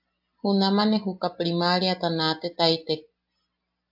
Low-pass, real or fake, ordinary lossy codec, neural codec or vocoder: 5.4 kHz; real; AAC, 48 kbps; none